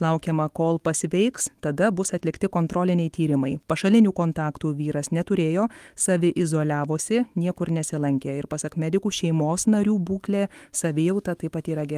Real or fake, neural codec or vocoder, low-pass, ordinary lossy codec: fake; autoencoder, 48 kHz, 128 numbers a frame, DAC-VAE, trained on Japanese speech; 14.4 kHz; Opus, 24 kbps